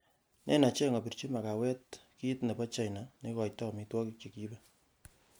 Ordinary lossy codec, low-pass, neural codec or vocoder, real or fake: none; none; none; real